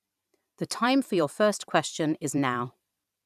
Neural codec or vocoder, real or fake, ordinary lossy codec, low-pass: vocoder, 44.1 kHz, 128 mel bands every 512 samples, BigVGAN v2; fake; none; 14.4 kHz